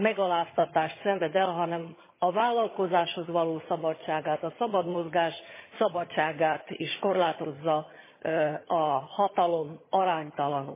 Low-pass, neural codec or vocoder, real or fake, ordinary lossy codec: 3.6 kHz; vocoder, 22.05 kHz, 80 mel bands, HiFi-GAN; fake; MP3, 16 kbps